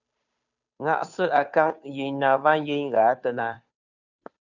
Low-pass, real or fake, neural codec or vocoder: 7.2 kHz; fake; codec, 16 kHz, 2 kbps, FunCodec, trained on Chinese and English, 25 frames a second